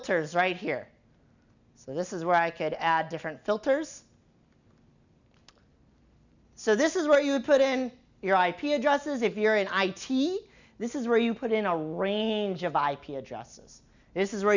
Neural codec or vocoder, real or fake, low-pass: none; real; 7.2 kHz